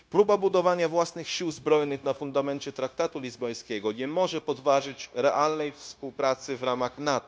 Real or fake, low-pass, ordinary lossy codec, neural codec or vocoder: fake; none; none; codec, 16 kHz, 0.9 kbps, LongCat-Audio-Codec